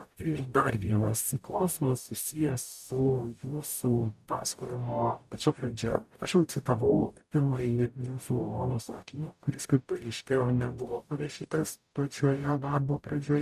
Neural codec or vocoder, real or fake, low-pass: codec, 44.1 kHz, 0.9 kbps, DAC; fake; 14.4 kHz